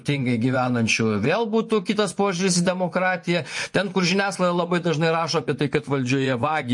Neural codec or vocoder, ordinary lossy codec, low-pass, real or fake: vocoder, 24 kHz, 100 mel bands, Vocos; MP3, 48 kbps; 10.8 kHz; fake